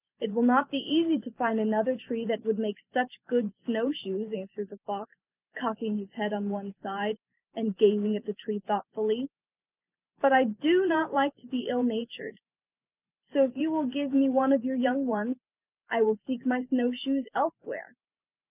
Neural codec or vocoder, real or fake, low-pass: vocoder, 44.1 kHz, 128 mel bands every 512 samples, BigVGAN v2; fake; 3.6 kHz